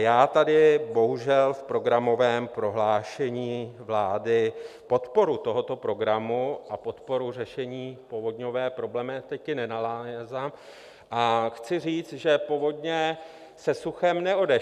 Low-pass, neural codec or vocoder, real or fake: 14.4 kHz; none; real